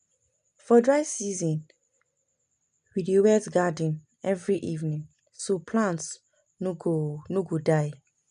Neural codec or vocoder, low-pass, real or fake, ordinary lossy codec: none; 9.9 kHz; real; none